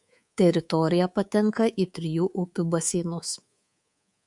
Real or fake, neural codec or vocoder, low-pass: fake; codec, 24 kHz, 3.1 kbps, DualCodec; 10.8 kHz